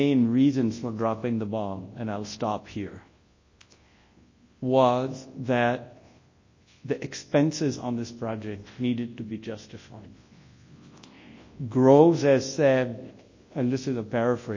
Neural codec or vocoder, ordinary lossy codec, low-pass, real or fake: codec, 24 kHz, 0.9 kbps, WavTokenizer, large speech release; MP3, 32 kbps; 7.2 kHz; fake